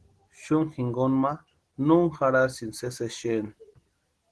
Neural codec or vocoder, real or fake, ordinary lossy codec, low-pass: none; real; Opus, 16 kbps; 10.8 kHz